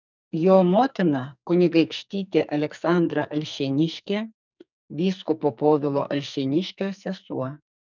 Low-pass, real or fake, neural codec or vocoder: 7.2 kHz; fake; codec, 44.1 kHz, 2.6 kbps, SNAC